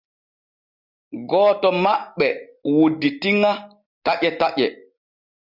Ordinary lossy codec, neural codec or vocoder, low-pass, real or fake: Opus, 64 kbps; none; 5.4 kHz; real